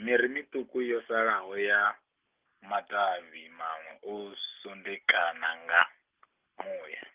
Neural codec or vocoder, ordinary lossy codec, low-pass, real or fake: none; Opus, 24 kbps; 3.6 kHz; real